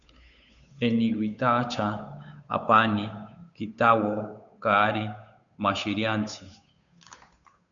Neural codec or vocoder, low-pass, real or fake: codec, 16 kHz, 8 kbps, FunCodec, trained on Chinese and English, 25 frames a second; 7.2 kHz; fake